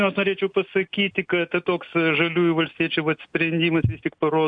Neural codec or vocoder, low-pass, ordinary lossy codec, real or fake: none; 9.9 kHz; AAC, 64 kbps; real